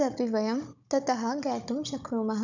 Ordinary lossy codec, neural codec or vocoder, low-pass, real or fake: none; codec, 16 kHz, 16 kbps, FunCodec, trained on Chinese and English, 50 frames a second; 7.2 kHz; fake